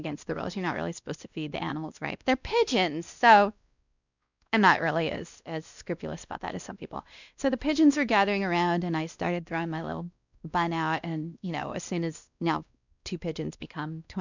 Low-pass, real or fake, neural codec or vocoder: 7.2 kHz; fake; codec, 16 kHz, 1 kbps, X-Codec, WavLM features, trained on Multilingual LibriSpeech